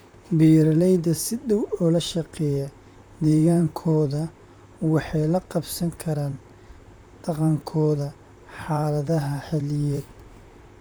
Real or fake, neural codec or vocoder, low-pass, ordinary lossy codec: fake; vocoder, 44.1 kHz, 128 mel bands, Pupu-Vocoder; none; none